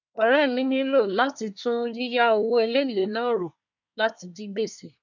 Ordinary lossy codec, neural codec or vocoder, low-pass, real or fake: none; codec, 24 kHz, 1 kbps, SNAC; 7.2 kHz; fake